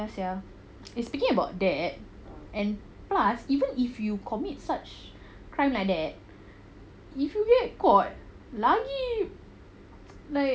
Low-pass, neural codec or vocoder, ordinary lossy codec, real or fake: none; none; none; real